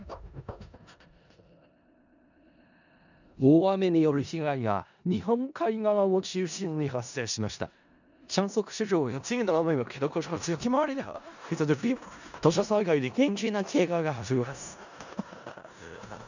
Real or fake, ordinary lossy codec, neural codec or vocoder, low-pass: fake; none; codec, 16 kHz in and 24 kHz out, 0.4 kbps, LongCat-Audio-Codec, four codebook decoder; 7.2 kHz